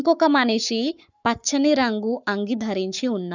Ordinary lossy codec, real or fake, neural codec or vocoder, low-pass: none; fake; codec, 44.1 kHz, 7.8 kbps, Pupu-Codec; 7.2 kHz